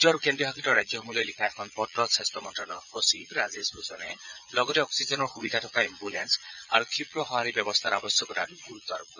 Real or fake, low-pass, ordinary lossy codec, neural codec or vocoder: fake; 7.2 kHz; none; vocoder, 22.05 kHz, 80 mel bands, Vocos